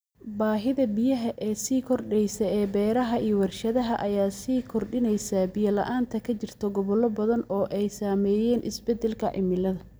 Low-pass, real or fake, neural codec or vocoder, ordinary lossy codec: none; real; none; none